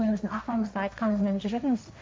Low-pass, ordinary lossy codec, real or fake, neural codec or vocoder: none; none; fake; codec, 16 kHz, 1.1 kbps, Voila-Tokenizer